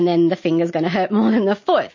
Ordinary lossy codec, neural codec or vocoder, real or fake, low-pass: MP3, 32 kbps; none; real; 7.2 kHz